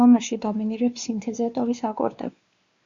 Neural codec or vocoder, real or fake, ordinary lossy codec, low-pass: codec, 16 kHz, 2 kbps, X-Codec, WavLM features, trained on Multilingual LibriSpeech; fake; Opus, 64 kbps; 7.2 kHz